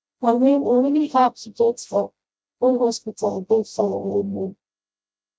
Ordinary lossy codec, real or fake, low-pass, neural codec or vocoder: none; fake; none; codec, 16 kHz, 0.5 kbps, FreqCodec, smaller model